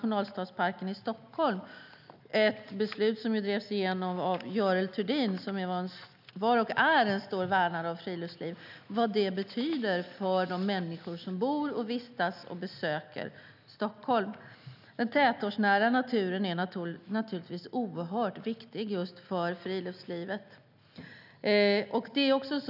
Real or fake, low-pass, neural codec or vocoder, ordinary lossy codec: real; 5.4 kHz; none; none